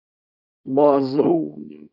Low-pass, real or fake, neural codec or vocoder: 5.4 kHz; fake; codec, 24 kHz, 0.9 kbps, WavTokenizer, small release